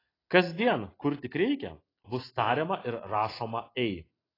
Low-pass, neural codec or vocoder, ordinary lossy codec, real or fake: 5.4 kHz; none; AAC, 24 kbps; real